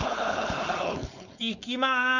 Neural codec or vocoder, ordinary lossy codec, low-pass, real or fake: codec, 16 kHz, 4.8 kbps, FACodec; none; 7.2 kHz; fake